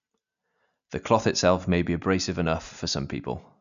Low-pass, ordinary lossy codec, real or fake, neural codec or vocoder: 7.2 kHz; none; real; none